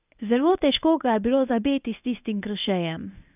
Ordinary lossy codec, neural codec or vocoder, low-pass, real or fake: none; codec, 24 kHz, 0.9 kbps, WavTokenizer, medium speech release version 1; 3.6 kHz; fake